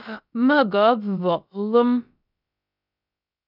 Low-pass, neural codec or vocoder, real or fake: 5.4 kHz; codec, 16 kHz, about 1 kbps, DyCAST, with the encoder's durations; fake